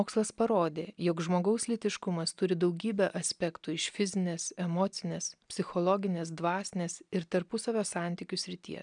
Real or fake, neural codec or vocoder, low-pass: real; none; 9.9 kHz